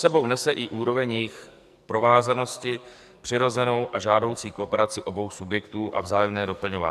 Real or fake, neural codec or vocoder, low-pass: fake; codec, 44.1 kHz, 2.6 kbps, SNAC; 14.4 kHz